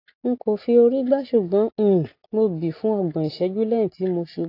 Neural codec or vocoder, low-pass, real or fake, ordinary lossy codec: none; 5.4 kHz; real; AAC, 32 kbps